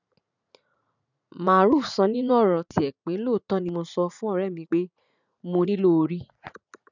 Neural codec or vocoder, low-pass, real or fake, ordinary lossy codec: vocoder, 44.1 kHz, 80 mel bands, Vocos; 7.2 kHz; fake; none